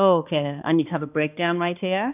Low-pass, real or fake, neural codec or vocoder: 3.6 kHz; fake; codec, 16 kHz, 2 kbps, X-Codec, HuBERT features, trained on balanced general audio